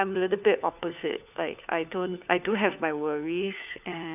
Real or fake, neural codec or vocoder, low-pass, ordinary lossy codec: fake; codec, 16 kHz, 4 kbps, FunCodec, trained on LibriTTS, 50 frames a second; 3.6 kHz; none